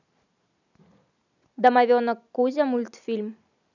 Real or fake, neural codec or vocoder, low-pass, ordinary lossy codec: real; none; 7.2 kHz; none